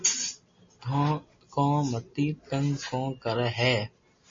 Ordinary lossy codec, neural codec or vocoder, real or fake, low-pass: MP3, 32 kbps; none; real; 7.2 kHz